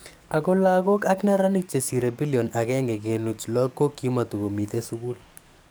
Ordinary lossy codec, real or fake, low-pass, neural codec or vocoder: none; fake; none; codec, 44.1 kHz, 7.8 kbps, DAC